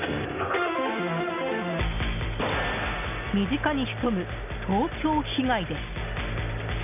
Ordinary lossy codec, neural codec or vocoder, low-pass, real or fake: none; none; 3.6 kHz; real